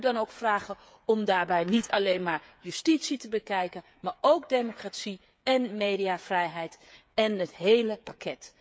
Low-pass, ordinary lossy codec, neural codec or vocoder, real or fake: none; none; codec, 16 kHz, 8 kbps, FreqCodec, smaller model; fake